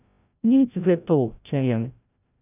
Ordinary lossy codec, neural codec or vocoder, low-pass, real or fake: none; codec, 16 kHz, 0.5 kbps, FreqCodec, larger model; 3.6 kHz; fake